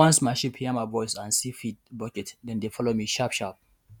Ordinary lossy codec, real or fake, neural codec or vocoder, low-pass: none; fake; vocoder, 48 kHz, 128 mel bands, Vocos; none